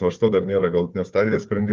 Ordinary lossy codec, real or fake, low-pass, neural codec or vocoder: Opus, 32 kbps; fake; 7.2 kHz; codec, 16 kHz, 4 kbps, FunCodec, trained on Chinese and English, 50 frames a second